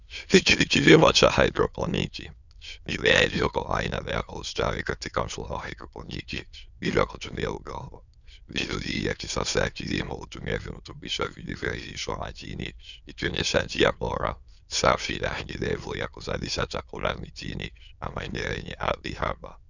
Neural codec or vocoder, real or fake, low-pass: autoencoder, 22.05 kHz, a latent of 192 numbers a frame, VITS, trained on many speakers; fake; 7.2 kHz